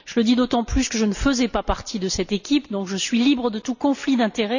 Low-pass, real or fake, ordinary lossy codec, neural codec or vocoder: 7.2 kHz; real; none; none